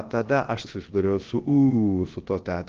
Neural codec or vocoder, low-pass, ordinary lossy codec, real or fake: codec, 16 kHz, about 1 kbps, DyCAST, with the encoder's durations; 7.2 kHz; Opus, 16 kbps; fake